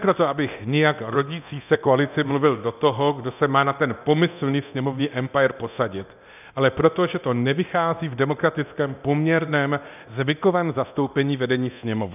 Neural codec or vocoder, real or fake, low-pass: codec, 24 kHz, 0.9 kbps, DualCodec; fake; 3.6 kHz